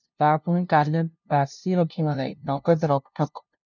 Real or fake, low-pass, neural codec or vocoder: fake; 7.2 kHz; codec, 16 kHz, 0.5 kbps, FunCodec, trained on LibriTTS, 25 frames a second